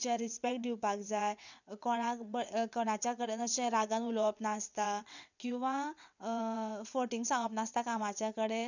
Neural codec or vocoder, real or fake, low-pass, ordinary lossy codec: vocoder, 22.05 kHz, 80 mel bands, WaveNeXt; fake; 7.2 kHz; none